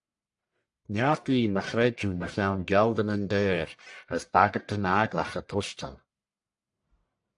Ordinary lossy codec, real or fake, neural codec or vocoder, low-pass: AAC, 64 kbps; fake; codec, 44.1 kHz, 1.7 kbps, Pupu-Codec; 10.8 kHz